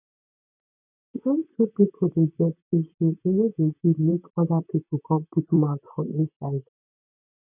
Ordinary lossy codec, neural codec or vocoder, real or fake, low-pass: none; vocoder, 44.1 kHz, 128 mel bands, Pupu-Vocoder; fake; 3.6 kHz